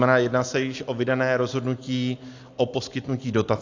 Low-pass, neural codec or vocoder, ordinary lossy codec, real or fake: 7.2 kHz; none; AAC, 48 kbps; real